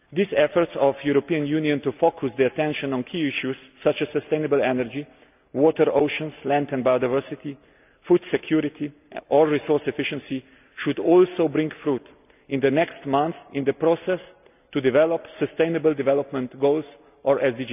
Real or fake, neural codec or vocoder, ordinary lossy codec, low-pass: real; none; none; 3.6 kHz